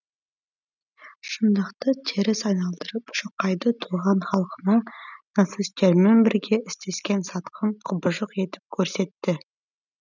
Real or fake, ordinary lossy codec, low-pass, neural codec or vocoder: real; none; 7.2 kHz; none